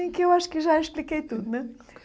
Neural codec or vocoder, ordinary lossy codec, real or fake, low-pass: none; none; real; none